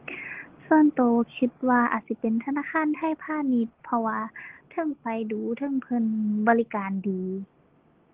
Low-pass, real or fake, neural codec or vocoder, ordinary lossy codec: 3.6 kHz; real; none; Opus, 16 kbps